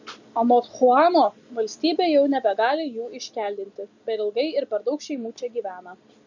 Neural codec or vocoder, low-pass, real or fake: none; 7.2 kHz; real